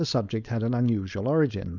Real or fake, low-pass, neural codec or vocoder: fake; 7.2 kHz; codec, 16 kHz, 4.8 kbps, FACodec